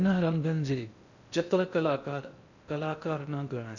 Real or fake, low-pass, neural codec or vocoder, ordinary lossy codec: fake; 7.2 kHz; codec, 16 kHz in and 24 kHz out, 0.6 kbps, FocalCodec, streaming, 4096 codes; none